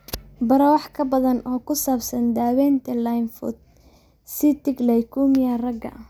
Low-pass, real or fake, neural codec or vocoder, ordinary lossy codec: none; real; none; none